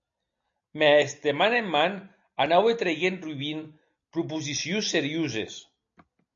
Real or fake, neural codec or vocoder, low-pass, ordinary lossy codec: real; none; 7.2 kHz; AAC, 64 kbps